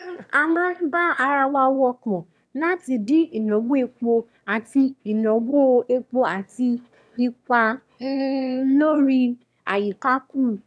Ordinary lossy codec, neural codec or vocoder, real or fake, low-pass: none; autoencoder, 22.05 kHz, a latent of 192 numbers a frame, VITS, trained on one speaker; fake; none